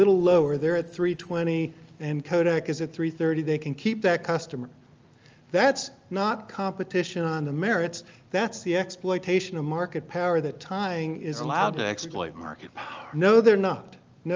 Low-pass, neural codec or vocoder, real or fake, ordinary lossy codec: 7.2 kHz; none; real; Opus, 24 kbps